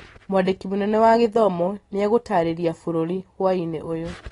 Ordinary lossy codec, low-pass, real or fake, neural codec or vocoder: AAC, 32 kbps; 10.8 kHz; real; none